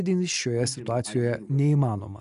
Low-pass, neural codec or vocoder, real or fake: 10.8 kHz; none; real